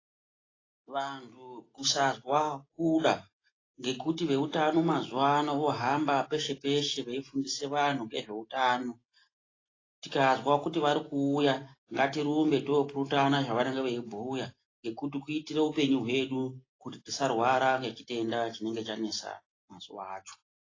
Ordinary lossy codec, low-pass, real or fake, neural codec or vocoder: AAC, 32 kbps; 7.2 kHz; real; none